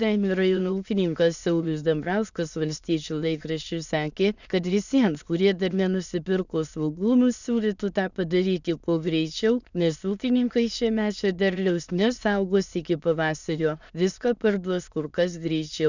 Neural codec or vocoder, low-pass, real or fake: autoencoder, 22.05 kHz, a latent of 192 numbers a frame, VITS, trained on many speakers; 7.2 kHz; fake